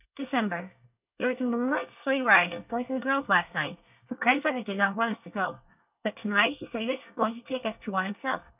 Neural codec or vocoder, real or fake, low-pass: codec, 24 kHz, 1 kbps, SNAC; fake; 3.6 kHz